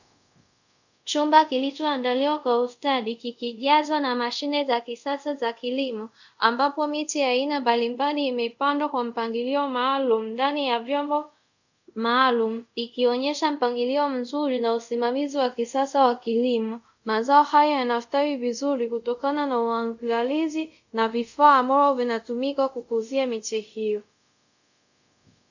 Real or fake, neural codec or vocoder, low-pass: fake; codec, 24 kHz, 0.5 kbps, DualCodec; 7.2 kHz